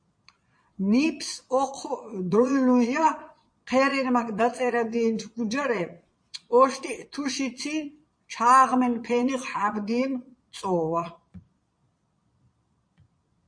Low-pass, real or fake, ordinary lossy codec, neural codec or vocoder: 9.9 kHz; fake; MP3, 48 kbps; vocoder, 22.05 kHz, 80 mel bands, Vocos